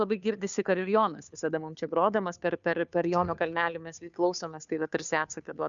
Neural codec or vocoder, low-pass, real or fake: codec, 16 kHz, 2 kbps, FunCodec, trained on Chinese and English, 25 frames a second; 7.2 kHz; fake